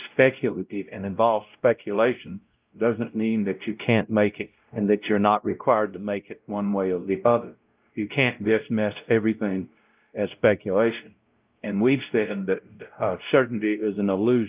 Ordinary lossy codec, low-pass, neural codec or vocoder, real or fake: Opus, 24 kbps; 3.6 kHz; codec, 16 kHz, 0.5 kbps, X-Codec, WavLM features, trained on Multilingual LibriSpeech; fake